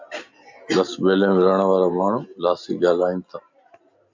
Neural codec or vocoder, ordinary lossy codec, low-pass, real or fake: none; MP3, 64 kbps; 7.2 kHz; real